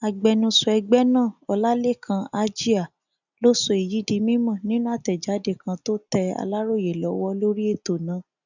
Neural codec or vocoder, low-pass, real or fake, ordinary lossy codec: none; 7.2 kHz; real; none